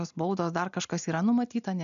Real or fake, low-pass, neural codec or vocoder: real; 7.2 kHz; none